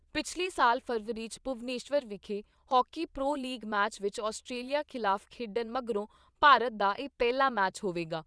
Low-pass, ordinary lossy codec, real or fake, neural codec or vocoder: none; none; fake; vocoder, 22.05 kHz, 80 mel bands, Vocos